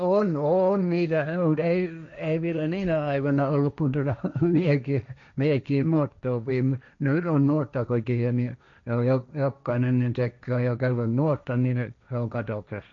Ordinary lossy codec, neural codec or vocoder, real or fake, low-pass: none; codec, 16 kHz, 1.1 kbps, Voila-Tokenizer; fake; 7.2 kHz